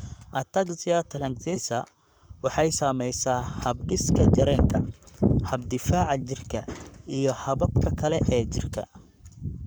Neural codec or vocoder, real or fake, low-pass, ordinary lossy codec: codec, 44.1 kHz, 7.8 kbps, Pupu-Codec; fake; none; none